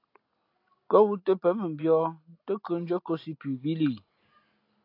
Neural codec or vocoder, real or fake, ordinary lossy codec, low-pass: none; real; AAC, 32 kbps; 5.4 kHz